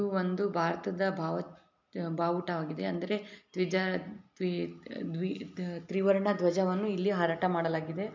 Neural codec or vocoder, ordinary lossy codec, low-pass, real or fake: none; MP3, 64 kbps; 7.2 kHz; real